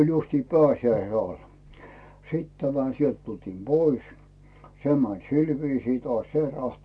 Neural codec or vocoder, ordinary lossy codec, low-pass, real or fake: none; none; none; real